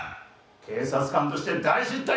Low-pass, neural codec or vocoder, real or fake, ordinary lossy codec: none; none; real; none